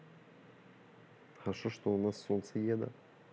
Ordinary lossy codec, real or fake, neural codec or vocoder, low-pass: none; real; none; none